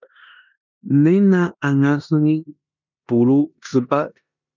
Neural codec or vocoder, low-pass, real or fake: codec, 16 kHz in and 24 kHz out, 0.9 kbps, LongCat-Audio-Codec, four codebook decoder; 7.2 kHz; fake